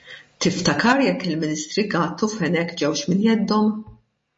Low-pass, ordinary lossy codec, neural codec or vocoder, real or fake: 9.9 kHz; MP3, 32 kbps; none; real